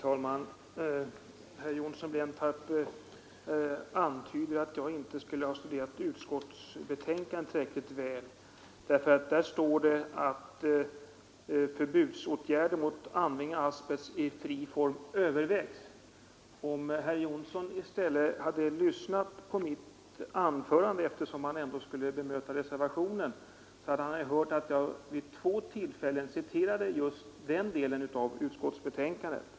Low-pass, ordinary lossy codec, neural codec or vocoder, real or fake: none; none; none; real